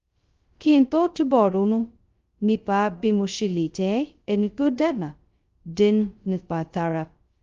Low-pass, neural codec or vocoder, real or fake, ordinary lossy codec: 7.2 kHz; codec, 16 kHz, 0.2 kbps, FocalCodec; fake; Opus, 32 kbps